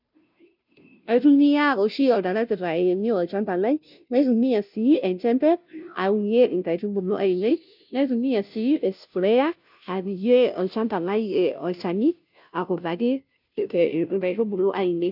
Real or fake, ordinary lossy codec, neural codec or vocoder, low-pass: fake; none; codec, 16 kHz, 0.5 kbps, FunCodec, trained on Chinese and English, 25 frames a second; 5.4 kHz